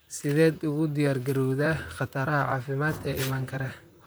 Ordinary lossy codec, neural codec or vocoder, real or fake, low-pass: none; vocoder, 44.1 kHz, 128 mel bands, Pupu-Vocoder; fake; none